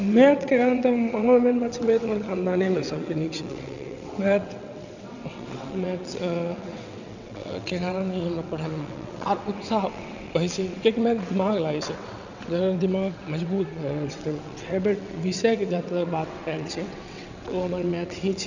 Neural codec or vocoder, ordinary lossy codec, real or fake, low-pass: vocoder, 22.05 kHz, 80 mel bands, WaveNeXt; none; fake; 7.2 kHz